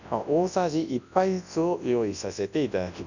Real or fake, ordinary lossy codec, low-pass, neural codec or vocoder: fake; none; 7.2 kHz; codec, 24 kHz, 0.9 kbps, WavTokenizer, large speech release